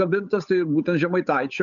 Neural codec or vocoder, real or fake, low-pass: codec, 16 kHz, 8 kbps, FunCodec, trained on Chinese and English, 25 frames a second; fake; 7.2 kHz